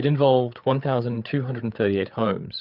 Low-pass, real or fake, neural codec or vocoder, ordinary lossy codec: 5.4 kHz; fake; codec, 16 kHz, 8 kbps, FreqCodec, larger model; Opus, 32 kbps